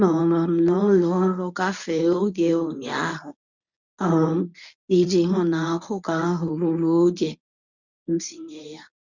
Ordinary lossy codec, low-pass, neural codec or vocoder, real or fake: none; 7.2 kHz; codec, 24 kHz, 0.9 kbps, WavTokenizer, medium speech release version 1; fake